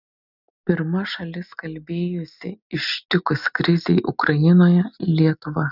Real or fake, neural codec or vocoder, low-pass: real; none; 5.4 kHz